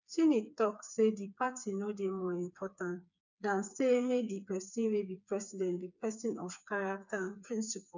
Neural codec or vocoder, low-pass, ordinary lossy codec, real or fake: codec, 16 kHz, 4 kbps, FreqCodec, smaller model; 7.2 kHz; none; fake